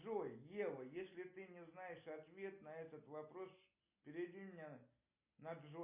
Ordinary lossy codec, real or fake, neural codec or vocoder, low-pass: MP3, 24 kbps; real; none; 3.6 kHz